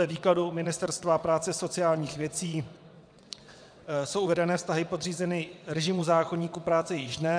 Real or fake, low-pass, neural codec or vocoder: fake; 9.9 kHz; vocoder, 22.05 kHz, 80 mel bands, WaveNeXt